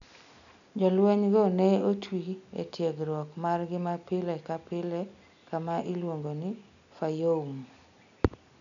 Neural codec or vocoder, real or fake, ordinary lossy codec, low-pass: none; real; none; 7.2 kHz